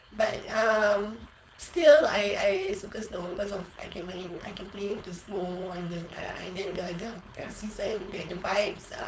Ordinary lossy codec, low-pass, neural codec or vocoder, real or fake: none; none; codec, 16 kHz, 4.8 kbps, FACodec; fake